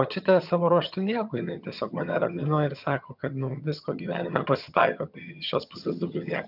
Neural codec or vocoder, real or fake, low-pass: vocoder, 22.05 kHz, 80 mel bands, HiFi-GAN; fake; 5.4 kHz